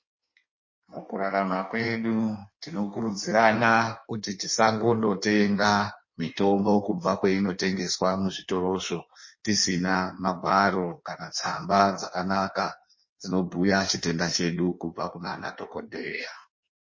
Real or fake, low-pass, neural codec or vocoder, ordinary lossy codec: fake; 7.2 kHz; codec, 16 kHz in and 24 kHz out, 1.1 kbps, FireRedTTS-2 codec; MP3, 32 kbps